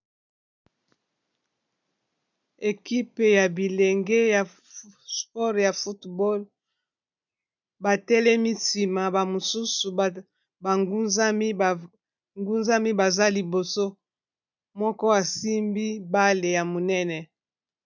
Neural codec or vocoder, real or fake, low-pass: none; real; 7.2 kHz